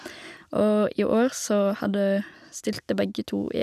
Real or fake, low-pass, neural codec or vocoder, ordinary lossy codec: real; 14.4 kHz; none; none